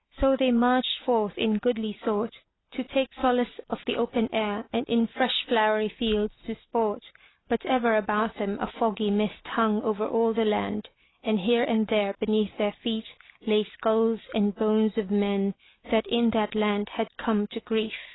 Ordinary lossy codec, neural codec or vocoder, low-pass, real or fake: AAC, 16 kbps; none; 7.2 kHz; real